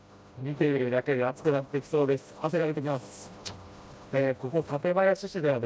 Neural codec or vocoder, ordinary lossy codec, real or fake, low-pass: codec, 16 kHz, 1 kbps, FreqCodec, smaller model; none; fake; none